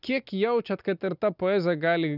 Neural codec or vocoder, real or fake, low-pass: none; real; 5.4 kHz